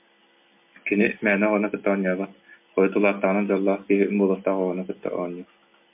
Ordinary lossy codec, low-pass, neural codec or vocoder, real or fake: MP3, 32 kbps; 3.6 kHz; none; real